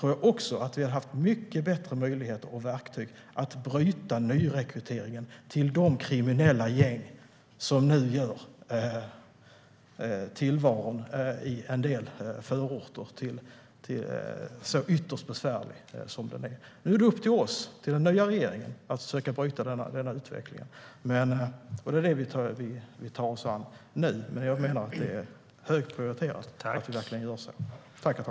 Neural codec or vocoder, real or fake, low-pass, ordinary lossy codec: none; real; none; none